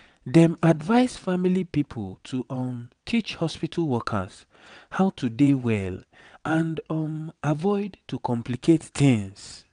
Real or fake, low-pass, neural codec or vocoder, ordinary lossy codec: fake; 9.9 kHz; vocoder, 22.05 kHz, 80 mel bands, WaveNeXt; MP3, 96 kbps